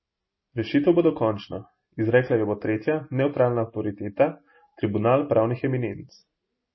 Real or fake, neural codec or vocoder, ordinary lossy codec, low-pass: real; none; MP3, 24 kbps; 7.2 kHz